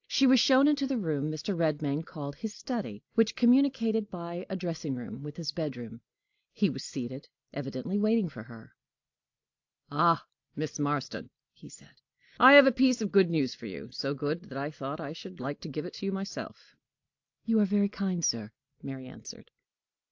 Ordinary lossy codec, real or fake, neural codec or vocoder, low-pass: AAC, 48 kbps; real; none; 7.2 kHz